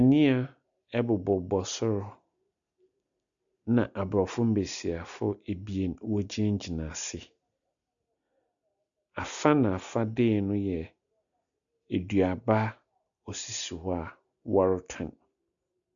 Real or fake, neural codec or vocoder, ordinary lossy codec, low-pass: real; none; MP3, 96 kbps; 7.2 kHz